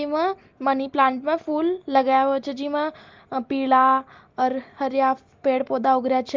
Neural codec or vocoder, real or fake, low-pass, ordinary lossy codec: none; real; 7.2 kHz; Opus, 32 kbps